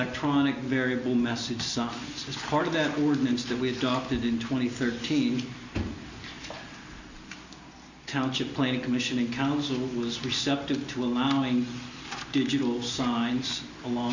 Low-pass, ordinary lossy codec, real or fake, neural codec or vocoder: 7.2 kHz; Opus, 64 kbps; real; none